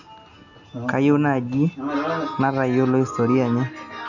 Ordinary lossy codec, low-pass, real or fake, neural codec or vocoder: none; 7.2 kHz; real; none